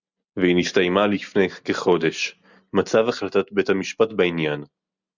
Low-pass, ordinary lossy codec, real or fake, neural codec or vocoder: 7.2 kHz; Opus, 64 kbps; real; none